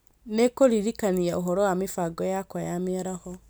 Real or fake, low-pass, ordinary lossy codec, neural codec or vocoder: real; none; none; none